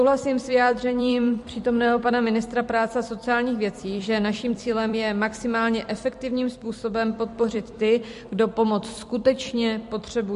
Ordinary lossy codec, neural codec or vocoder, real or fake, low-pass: MP3, 48 kbps; vocoder, 44.1 kHz, 128 mel bands every 256 samples, BigVGAN v2; fake; 14.4 kHz